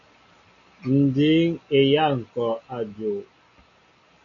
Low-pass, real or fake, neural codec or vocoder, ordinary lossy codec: 7.2 kHz; real; none; AAC, 64 kbps